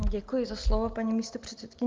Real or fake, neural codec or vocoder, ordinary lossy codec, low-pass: real; none; Opus, 24 kbps; 7.2 kHz